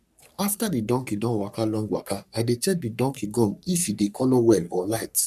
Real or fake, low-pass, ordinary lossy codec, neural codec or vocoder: fake; 14.4 kHz; none; codec, 44.1 kHz, 3.4 kbps, Pupu-Codec